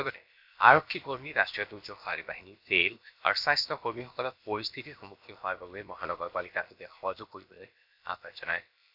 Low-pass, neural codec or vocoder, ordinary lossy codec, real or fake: 5.4 kHz; codec, 16 kHz, about 1 kbps, DyCAST, with the encoder's durations; none; fake